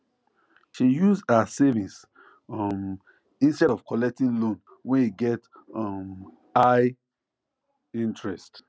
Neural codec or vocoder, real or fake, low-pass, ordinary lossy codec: none; real; none; none